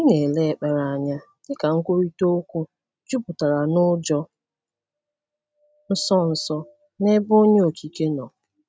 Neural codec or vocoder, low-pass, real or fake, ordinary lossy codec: none; none; real; none